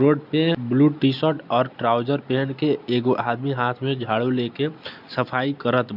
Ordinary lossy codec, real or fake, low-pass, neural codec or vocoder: none; real; 5.4 kHz; none